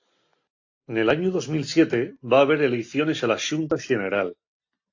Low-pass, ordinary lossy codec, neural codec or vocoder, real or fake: 7.2 kHz; AAC, 48 kbps; none; real